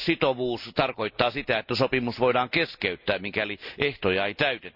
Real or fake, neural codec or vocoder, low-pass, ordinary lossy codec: real; none; 5.4 kHz; none